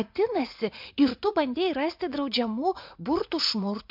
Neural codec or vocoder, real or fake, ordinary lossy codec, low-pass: vocoder, 44.1 kHz, 80 mel bands, Vocos; fake; MP3, 48 kbps; 5.4 kHz